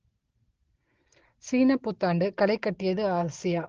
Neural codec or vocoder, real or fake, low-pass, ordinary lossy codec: none; real; 7.2 kHz; Opus, 16 kbps